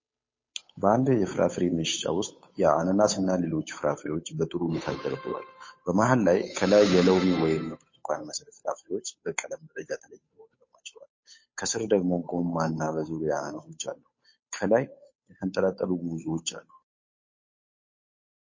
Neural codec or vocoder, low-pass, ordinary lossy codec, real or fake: codec, 16 kHz, 8 kbps, FunCodec, trained on Chinese and English, 25 frames a second; 7.2 kHz; MP3, 32 kbps; fake